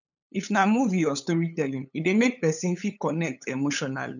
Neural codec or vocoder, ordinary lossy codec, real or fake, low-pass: codec, 16 kHz, 8 kbps, FunCodec, trained on LibriTTS, 25 frames a second; none; fake; 7.2 kHz